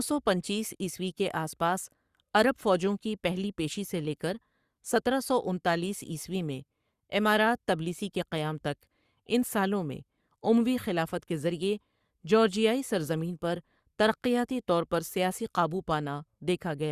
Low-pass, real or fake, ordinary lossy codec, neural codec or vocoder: 14.4 kHz; fake; Opus, 64 kbps; codec, 44.1 kHz, 7.8 kbps, Pupu-Codec